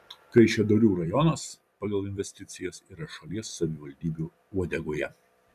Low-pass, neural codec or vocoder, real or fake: 14.4 kHz; vocoder, 44.1 kHz, 128 mel bands every 256 samples, BigVGAN v2; fake